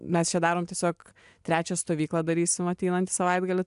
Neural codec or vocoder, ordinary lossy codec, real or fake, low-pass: none; AAC, 96 kbps; real; 10.8 kHz